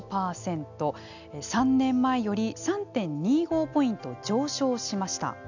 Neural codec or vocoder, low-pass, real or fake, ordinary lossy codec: none; 7.2 kHz; real; none